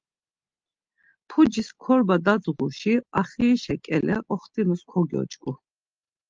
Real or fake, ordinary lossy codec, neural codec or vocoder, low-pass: real; Opus, 24 kbps; none; 7.2 kHz